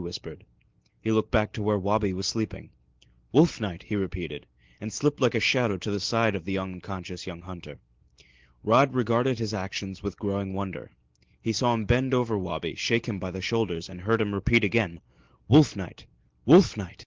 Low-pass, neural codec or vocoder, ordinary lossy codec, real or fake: 7.2 kHz; none; Opus, 16 kbps; real